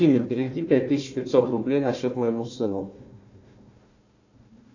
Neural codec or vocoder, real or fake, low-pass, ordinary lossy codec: codec, 16 kHz, 1 kbps, FunCodec, trained on Chinese and English, 50 frames a second; fake; 7.2 kHz; AAC, 48 kbps